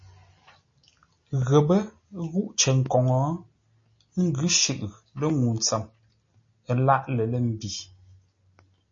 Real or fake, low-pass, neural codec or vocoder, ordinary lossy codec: real; 7.2 kHz; none; MP3, 32 kbps